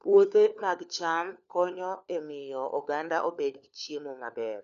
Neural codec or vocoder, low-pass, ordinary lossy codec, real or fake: codec, 16 kHz, 4 kbps, FunCodec, trained on LibriTTS, 50 frames a second; 7.2 kHz; none; fake